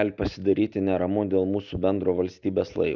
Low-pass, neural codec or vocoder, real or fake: 7.2 kHz; none; real